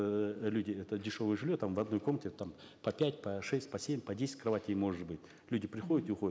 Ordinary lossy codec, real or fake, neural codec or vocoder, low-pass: none; real; none; none